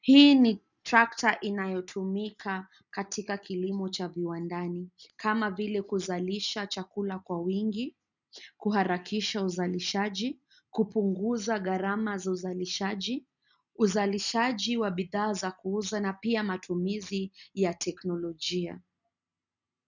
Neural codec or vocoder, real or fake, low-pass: none; real; 7.2 kHz